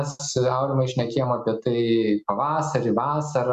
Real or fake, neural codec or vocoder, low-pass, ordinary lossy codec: real; none; 14.4 kHz; Opus, 64 kbps